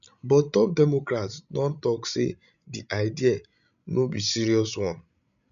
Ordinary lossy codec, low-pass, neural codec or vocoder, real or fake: none; 7.2 kHz; codec, 16 kHz, 16 kbps, FreqCodec, larger model; fake